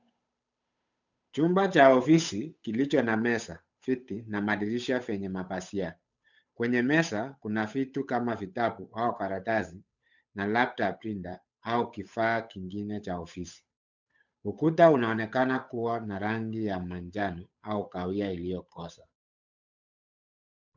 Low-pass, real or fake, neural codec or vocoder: 7.2 kHz; fake; codec, 16 kHz, 8 kbps, FunCodec, trained on Chinese and English, 25 frames a second